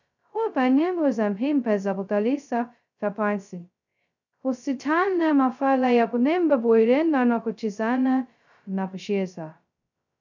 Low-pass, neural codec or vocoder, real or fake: 7.2 kHz; codec, 16 kHz, 0.2 kbps, FocalCodec; fake